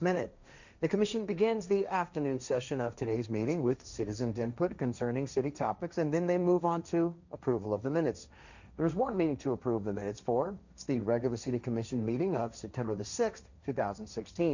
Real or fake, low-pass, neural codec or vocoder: fake; 7.2 kHz; codec, 16 kHz, 1.1 kbps, Voila-Tokenizer